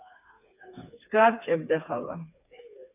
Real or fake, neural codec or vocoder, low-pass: fake; codec, 16 kHz, 4 kbps, FreqCodec, smaller model; 3.6 kHz